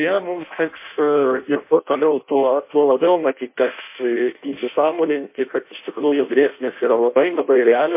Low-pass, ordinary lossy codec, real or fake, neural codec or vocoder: 3.6 kHz; MP3, 24 kbps; fake; codec, 16 kHz in and 24 kHz out, 0.6 kbps, FireRedTTS-2 codec